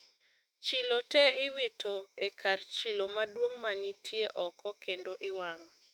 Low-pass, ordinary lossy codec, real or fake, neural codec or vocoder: 19.8 kHz; none; fake; autoencoder, 48 kHz, 32 numbers a frame, DAC-VAE, trained on Japanese speech